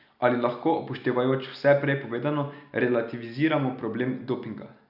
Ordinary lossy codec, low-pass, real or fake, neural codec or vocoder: none; 5.4 kHz; real; none